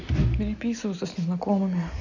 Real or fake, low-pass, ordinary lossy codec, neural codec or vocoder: real; 7.2 kHz; none; none